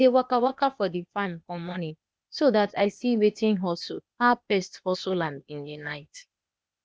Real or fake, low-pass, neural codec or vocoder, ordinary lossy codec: fake; none; codec, 16 kHz, 0.8 kbps, ZipCodec; none